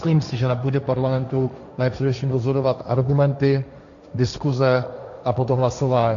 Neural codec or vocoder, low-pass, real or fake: codec, 16 kHz, 1.1 kbps, Voila-Tokenizer; 7.2 kHz; fake